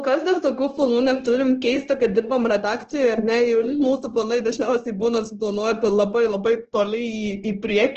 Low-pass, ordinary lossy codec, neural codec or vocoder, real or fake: 7.2 kHz; Opus, 16 kbps; codec, 16 kHz, 0.9 kbps, LongCat-Audio-Codec; fake